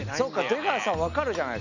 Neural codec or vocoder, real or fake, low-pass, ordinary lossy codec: none; real; 7.2 kHz; none